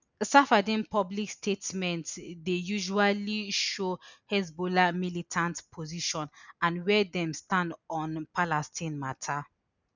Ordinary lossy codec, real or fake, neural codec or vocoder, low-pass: none; real; none; 7.2 kHz